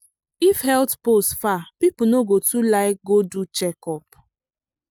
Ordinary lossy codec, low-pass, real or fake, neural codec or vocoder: none; none; real; none